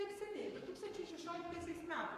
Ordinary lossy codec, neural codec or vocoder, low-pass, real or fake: MP3, 96 kbps; codec, 44.1 kHz, 7.8 kbps, Pupu-Codec; 14.4 kHz; fake